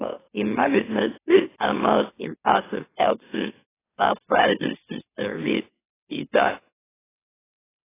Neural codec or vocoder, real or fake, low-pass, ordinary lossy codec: autoencoder, 44.1 kHz, a latent of 192 numbers a frame, MeloTTS; fake; 3.6 kHz; AAC, 16 kbps